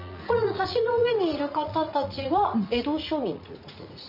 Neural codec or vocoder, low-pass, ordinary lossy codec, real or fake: vocoder, 44.1 kHz, 80 mel bands, Vocos; 5.4 kHz; none; fake